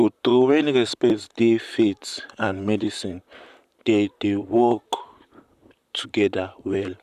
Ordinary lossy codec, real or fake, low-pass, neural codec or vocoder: none; fake; 14.4 kHz; vocoder, 44.1 kHz, 128 mel bands, Pupu-Vocoder